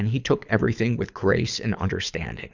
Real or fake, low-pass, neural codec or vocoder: fake; 7.2 kHz; codec, 24 kHz, 6 kbps, HILCodec